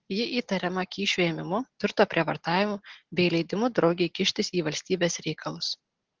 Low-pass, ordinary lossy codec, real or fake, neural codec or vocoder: 7.2 kHz; Opus, 16 kbps; real; none